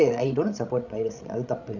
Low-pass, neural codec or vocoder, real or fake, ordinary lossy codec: 7.2 kHz; codec, 16 kHz, 16 kbps, FreqCodec, larger model; fake; none